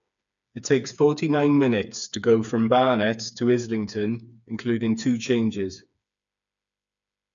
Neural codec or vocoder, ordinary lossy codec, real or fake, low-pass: codec, 16 kHz, 4 kbps, FreqCodec, smaller model; none; fake; 7.2 kHz